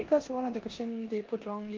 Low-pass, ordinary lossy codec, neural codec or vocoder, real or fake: 7.2 kHz; Opus, 32 kbps; codec, 16 kHz in and 24 kHz out, 0.9 kbps, LongCat-Audio-Codec, four codebook decoder; fake